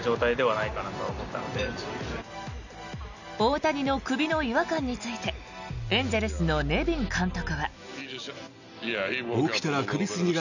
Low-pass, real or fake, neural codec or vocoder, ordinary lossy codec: 7.2 kHz; real; none; none